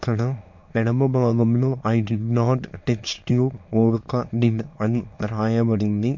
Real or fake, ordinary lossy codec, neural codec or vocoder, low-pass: fake; MP3, 48 kbps; autoencoder, 22.05 kHz, a latent of 192 numbers a frame, VITS, trained on many speakers; 7.2 kHz